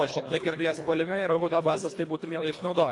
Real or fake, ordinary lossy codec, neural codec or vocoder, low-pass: fake; AAC, 48 kbps; codec, 24 kHz, 1.5 kbps, HILCodec; 10.8 kHz